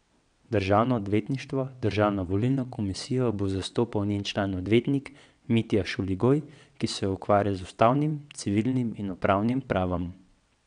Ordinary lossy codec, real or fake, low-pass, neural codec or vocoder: none; fake; 9.9 kHz; vocoder, 22.05 kHz, 80 mel bands, WaveNeXt